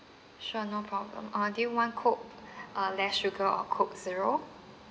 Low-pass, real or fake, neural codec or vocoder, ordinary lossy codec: none; real; none; none